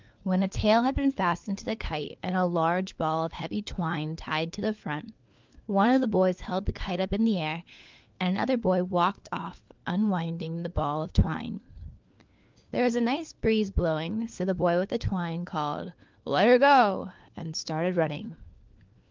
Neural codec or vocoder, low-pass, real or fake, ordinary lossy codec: codec, 16 kHz, 4 kbps, FunCodec, trained on LibriTTS, 50 frames a second; 7.2 kHz; fake; Opus, 24 kbps